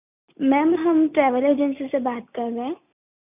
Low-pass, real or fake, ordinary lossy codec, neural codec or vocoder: 3.6 kHz; real; none; none